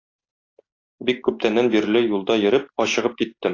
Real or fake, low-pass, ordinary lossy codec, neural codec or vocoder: real; 7.2 kHz; AAC, 32 kbps; none